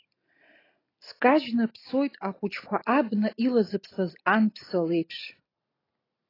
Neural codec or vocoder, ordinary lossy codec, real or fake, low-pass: none; AAC, 24 kbps; real; 5.4 kHz